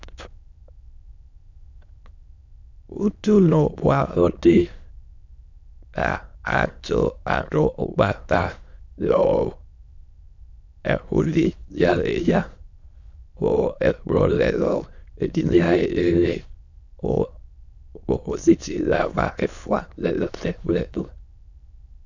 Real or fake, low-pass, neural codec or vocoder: fake; 7.2 kHz; autoencoder, 22.05 kHz, a latent of 192 numbers a frame, VITS, trained on many speakers